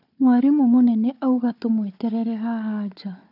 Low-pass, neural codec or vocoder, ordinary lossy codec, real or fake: 5.4 kHz; codec, 16 kHz, 4 kbps, FunCodec, trained on Chinese and English, 50 frames a second; none; fake